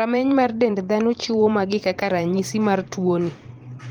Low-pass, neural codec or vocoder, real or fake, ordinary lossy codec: 19.8 kHz; vocoder, 44.1 kHz, 128 mel bands every 512 samples, BigVGAN v2; fake; Opus, 24 kbps